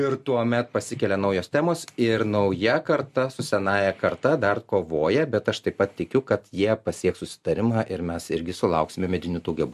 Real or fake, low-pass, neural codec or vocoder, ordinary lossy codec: real; 14.4 kHz; none; MP3, 64 kbps